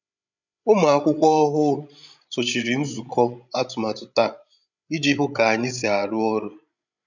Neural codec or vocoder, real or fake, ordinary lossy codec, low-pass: codec, 16 kHz, 16 kbps, FreqCodec, larger model; fake; none; 7.2 kHz